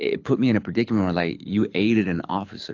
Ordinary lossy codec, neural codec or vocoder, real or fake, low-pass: AAC, 48 kbps; codec, 24 kHz, 6 kbps, HILCodec; fake; 7.2 kHz